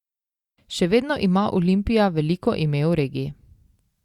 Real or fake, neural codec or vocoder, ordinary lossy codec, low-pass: real; none; Opus, 64 kbps; 19.8 kHz